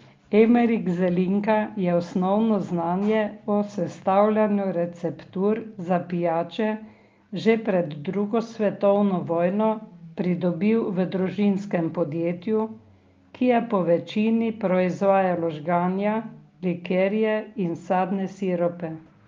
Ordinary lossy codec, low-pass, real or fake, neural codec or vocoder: Opus, 32 kbps; 7.2 kHz; real; none